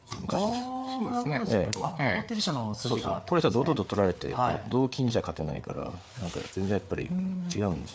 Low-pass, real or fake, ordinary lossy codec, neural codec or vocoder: none; fake; none; codec, 16 kHz, 4 kbps, FreqCodec, larger model